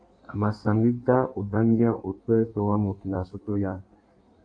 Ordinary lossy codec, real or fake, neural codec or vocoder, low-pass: AAC, 48 kbps; fake; codec, 16 kHz in and 24 kHz out, 1.1 kbps, FireRedTTS-2 codec; 9.9 kHz